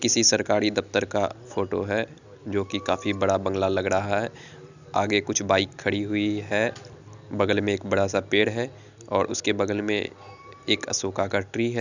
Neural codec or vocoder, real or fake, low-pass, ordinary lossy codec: none; real; 7.2 kHz; none